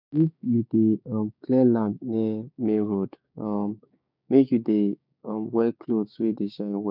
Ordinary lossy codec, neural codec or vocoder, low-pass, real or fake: none; codec, 16 kHz, 6 kbps, DAC; 5.4 kHz; fake